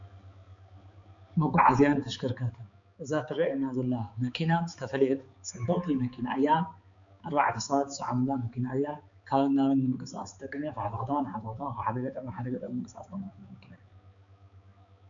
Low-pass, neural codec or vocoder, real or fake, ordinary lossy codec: 7.2 kHz; codec, 16 kHz, 4 kbps, X-Codec, HuBERT features, trained on balanced general audio; fake; MP3, 64 kbps